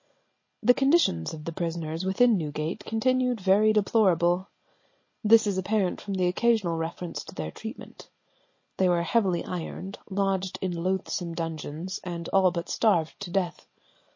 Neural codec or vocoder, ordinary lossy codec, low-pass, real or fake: none; MP3, 32 kbps; 7.2 kHz; real